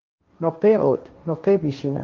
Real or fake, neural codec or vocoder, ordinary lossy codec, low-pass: fake; codec, 16 kHz, 1.1 kbps, Voila-Tokenizer; Opus, 24 kbps; 7.2 kHz